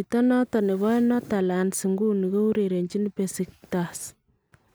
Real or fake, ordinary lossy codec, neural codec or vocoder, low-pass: real; none; none; none